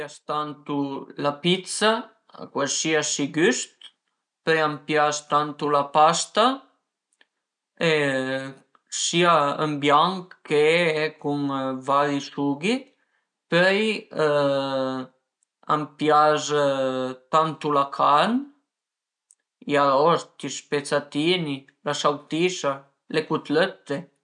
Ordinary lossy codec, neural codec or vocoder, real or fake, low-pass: none; none; real; 9.9 kHz